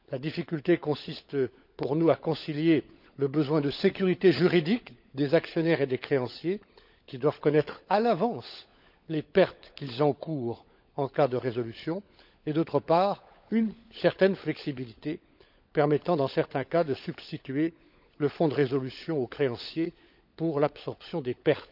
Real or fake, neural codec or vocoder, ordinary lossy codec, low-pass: fake; codec, 16 kHz, 16 kbps, FunCodec, trained on LibriTTS, 50 frames a second; none; 5.4 kHz